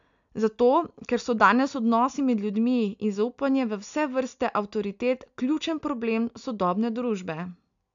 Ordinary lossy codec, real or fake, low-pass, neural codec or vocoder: none; real; 7.2 kHz; none